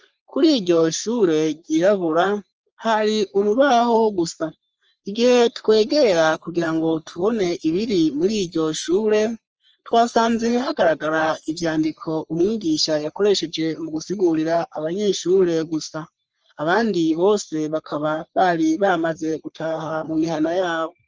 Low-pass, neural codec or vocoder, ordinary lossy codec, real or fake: 7.2 kHz; codec, 44.1 kHz, 3.4 kbps, Pupu-Codec; Opus, 24 kbps; fake